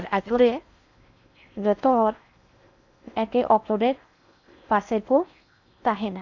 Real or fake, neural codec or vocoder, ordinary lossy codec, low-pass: fake; codec, 16 kHz in and 24 kHz out, 0.6 kbps, FocalCodec, streaming, 4096 codes; none; 7.2 kHz